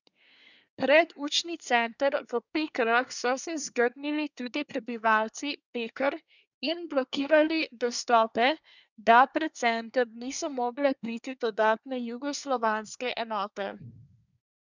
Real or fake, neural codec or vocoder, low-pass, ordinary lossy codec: fake; codec, 24 kHz, 1 kbps, SNAC; 7.2 kHz; none